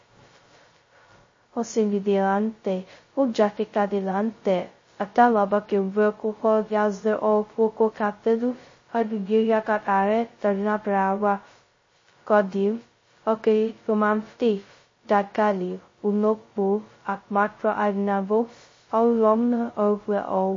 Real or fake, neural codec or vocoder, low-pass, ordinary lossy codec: fake; codec, 16 kHz, 0.2 kbps, FocalCodec; 7.2 kHz; MP3, 32 kbps